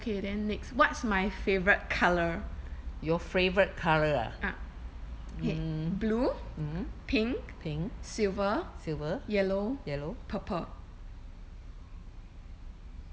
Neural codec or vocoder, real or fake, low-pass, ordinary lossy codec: none; real; none; none